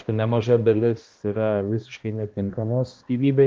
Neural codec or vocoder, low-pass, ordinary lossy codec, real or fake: codec, 16 kHz, 1 kbps, X-Codec, HuBERT features, trained on balanced general audio; 7.2 kHz; Opus, 32 kbps; fake